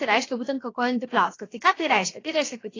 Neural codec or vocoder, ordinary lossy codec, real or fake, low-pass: codec, 16 kHz, 0.7 kbps, FocalCodec; AAC, 32 kbps; fake; 7.2 kHz